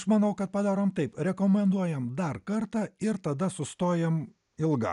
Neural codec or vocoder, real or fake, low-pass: none; real; 10.8 kHz